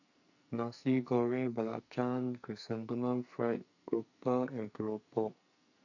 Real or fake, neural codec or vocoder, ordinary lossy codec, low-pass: fake; codec, 44.1 kHz, 2.6 kbps, SNAC; none; 7.2 kHz